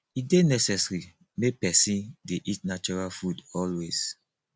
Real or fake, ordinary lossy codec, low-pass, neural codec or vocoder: real; none; none; none